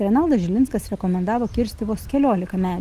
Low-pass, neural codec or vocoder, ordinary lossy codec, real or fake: 14.4 kHz; none; Opus, 24 kbps; real